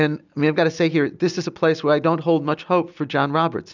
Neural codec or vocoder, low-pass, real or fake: none; 7.2 kHz; real